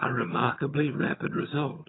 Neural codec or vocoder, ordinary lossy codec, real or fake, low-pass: vocoder, 22.05 kHz, 80 mel bands, HiFi-GAN; AAC, 16 kbps; fake; 7.2 kHz